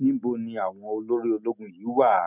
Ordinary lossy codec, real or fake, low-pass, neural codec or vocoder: none; real; 3.6 kHz; none